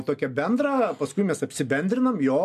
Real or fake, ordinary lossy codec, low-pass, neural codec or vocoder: real; AAC, 96 kbps; 14.4 kHz; none